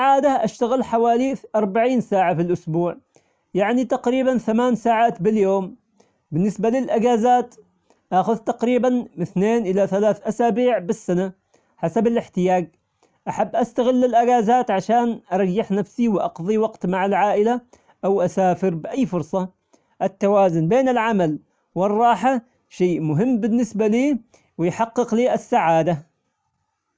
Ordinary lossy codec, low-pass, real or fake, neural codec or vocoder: none; none; real; none